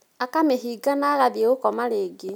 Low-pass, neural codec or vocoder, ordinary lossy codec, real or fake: none; none; none; real